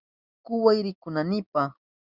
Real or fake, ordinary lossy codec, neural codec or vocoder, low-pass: real; Opus, 64 kbps; none; 5.4 kHz